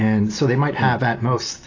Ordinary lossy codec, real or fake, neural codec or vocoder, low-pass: AAC, 32 kbps; real; none; 7.2 kHz